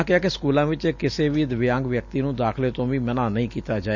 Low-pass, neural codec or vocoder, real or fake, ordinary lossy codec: 7.2 kHz; none; real; none